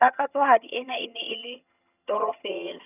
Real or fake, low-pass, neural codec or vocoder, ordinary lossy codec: fake; 3.6 kHz; vocoder, 22.05 kHz, 80 mel bands, HiFi-GAN; none